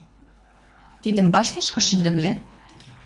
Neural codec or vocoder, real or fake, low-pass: codec, 24 kHz, 1.5 kbps, HILCodec; fake; 10.8 kHz